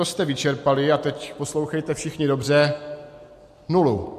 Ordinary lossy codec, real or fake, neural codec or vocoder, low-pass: MP3, 64 kbps; real; none; 14.4 kHz